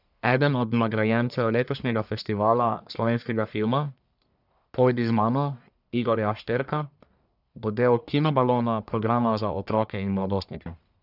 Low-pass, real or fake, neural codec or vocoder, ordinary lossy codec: 5.4 kHz; fake; codec, 44.1 kHz, 1.7 kbps, Pupu-Codec; none